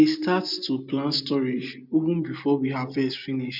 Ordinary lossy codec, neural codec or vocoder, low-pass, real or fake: MP3, 48 kbps; none; 5.4 kHz; real